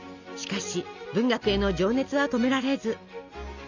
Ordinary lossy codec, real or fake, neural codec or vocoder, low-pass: none; real; none; 7.2 kHz